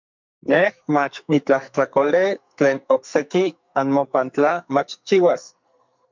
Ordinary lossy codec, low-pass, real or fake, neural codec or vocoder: MP3, 64 kbps; 7.2 kHz; fake; codec, 44.1 kHz, 2.6 kbps, SNAC